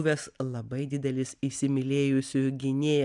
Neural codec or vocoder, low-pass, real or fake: none; 10.8 kHz; real